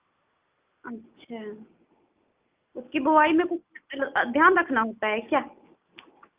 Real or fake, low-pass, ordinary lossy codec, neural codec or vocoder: real; 3.6 kHz; Opus, 24 kbps; none